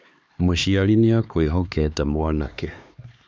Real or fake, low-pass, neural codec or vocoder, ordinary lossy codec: fake; none; codec, 16 kHz, 2 kbps, X-Codec, HuBERT features, trained on LibriSpeech; none